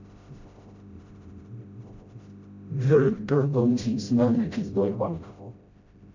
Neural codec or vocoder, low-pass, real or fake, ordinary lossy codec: codec, 16 kHz, 0.5 kbps, FreqCodec, smaller model; 7.2 kHz; fake; MP3, 64 kbps